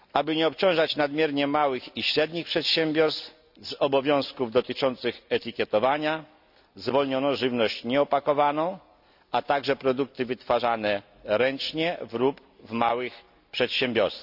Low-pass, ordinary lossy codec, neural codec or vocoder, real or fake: 5.4 kHz; none; none; real